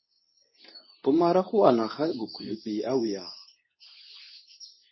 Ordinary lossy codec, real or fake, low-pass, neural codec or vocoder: MP3, 24 kbps; fake; 7.2 kHz; codec, 24 kHz, 0.9 kbps, WavTokenizer, medium speech release version 2